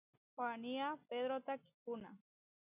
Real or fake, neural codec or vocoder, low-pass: real; none; 3.6 kHz